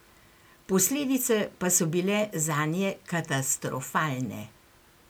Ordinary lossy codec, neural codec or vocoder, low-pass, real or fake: none; none; none; real